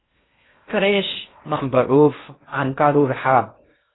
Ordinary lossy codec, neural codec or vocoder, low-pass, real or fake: AAC, 16 kbps; codec, 16 kHz in and 24 kHz out, 0.6 kbps, FocalCodec, streaming, 4096 codes; 7.2 kHz; fake